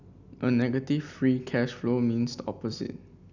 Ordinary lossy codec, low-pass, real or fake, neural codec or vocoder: none; 7.2 kHz; real; none